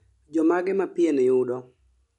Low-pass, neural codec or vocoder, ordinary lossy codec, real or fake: 10.8 kHz; none; none; real